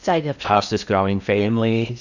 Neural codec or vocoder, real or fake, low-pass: codec, 16 kHz in and 24 kHz out, 0.6 kbps, FocalCodec, streaming, 4096 codes; fake; 7.2 kHz